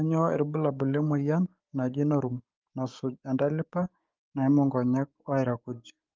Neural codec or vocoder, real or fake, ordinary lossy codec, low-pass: codec, 16 kHz, 6 kbps, DAC; fake; Opus, 32 kbps; 7.2 kHz